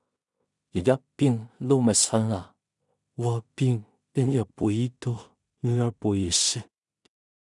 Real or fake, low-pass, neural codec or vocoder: fake; 10.8 kHz; codec, 16 kHz in and 24 kHz out, 0.4 kbps, LongCat-Audio-Codec, two codebook decoder